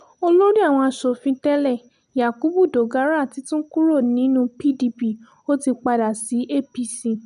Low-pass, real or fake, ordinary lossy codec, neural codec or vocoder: 10.8 kHz; real; none; none